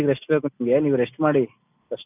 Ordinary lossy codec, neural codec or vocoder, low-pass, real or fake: none; none; 3.6 kHz; real